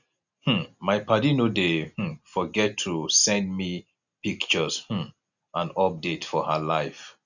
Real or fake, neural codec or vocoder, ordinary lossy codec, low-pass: real; none; none; 7.2 kHz